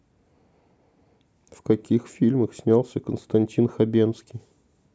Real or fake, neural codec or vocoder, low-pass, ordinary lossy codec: real; none; none; none